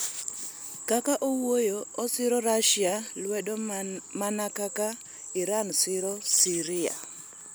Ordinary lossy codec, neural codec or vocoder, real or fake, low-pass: none; none; real; none